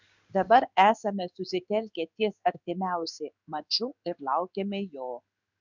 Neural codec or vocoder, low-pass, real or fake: codec, 16 kHz in and 24 kHz out, 1 kbps, XY-Tokenizer; 7.2 kHz; fake